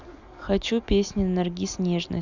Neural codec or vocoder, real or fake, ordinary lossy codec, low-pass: none; real; none; 7.2 kHz